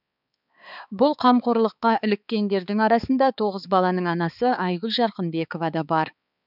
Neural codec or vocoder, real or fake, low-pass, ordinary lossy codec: codec, 16 kHz, 4 kbps, X-Codec, HuBERT features, trained on balanced general audio; fake; 5.4 kHz; none